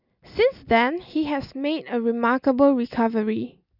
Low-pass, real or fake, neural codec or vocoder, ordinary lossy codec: 5.4 kHz; real; none; none